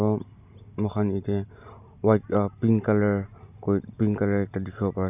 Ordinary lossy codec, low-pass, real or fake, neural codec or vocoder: none; 3.6 kHz; real; none